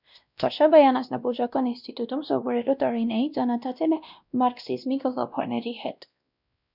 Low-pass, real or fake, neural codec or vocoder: 5.4 kHz; fake; codec, 16 kHz, 1 kbps, X-Codec, WavLM features, trained on Multilingual LibriSpeech